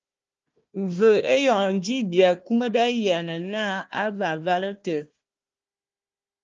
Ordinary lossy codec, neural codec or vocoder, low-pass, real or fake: Opus, 24 kbps; codec, 16 kHz, 1 kbps, FunCodec, trained on Chinese and English, 50 frames a second; 7.2 kHz; fake